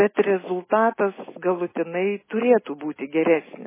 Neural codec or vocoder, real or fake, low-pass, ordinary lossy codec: none; real; 3.6 kHz; MP3, 16 kbps